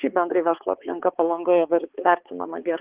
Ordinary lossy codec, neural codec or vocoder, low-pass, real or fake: Opus, 16 kbps; codec, 16 kHz, 4 kbps, X-Codec, HuBERT features, trained on balanced general audio; 3.6 kHz; fake